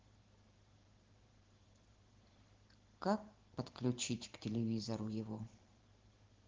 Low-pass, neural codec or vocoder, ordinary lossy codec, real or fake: 7.2 kHz; vocoder, 44.1 kHz, 128 mel bands every 512 samples, BigVGAN v2; Opus, 16 kbps; fake